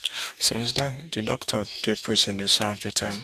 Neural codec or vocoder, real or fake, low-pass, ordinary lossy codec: codec, 44.1 kHz, 2.6 kbps, DAC; fake; 14.4 kHz; none